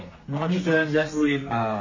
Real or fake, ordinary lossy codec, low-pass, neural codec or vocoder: fake; MP3, 32 kbps; 7.2 kHz; codec, 32 kHz, 1.9 kbps, SNAC